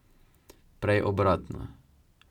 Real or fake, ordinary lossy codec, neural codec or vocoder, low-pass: real; none; none; 19.8 kHz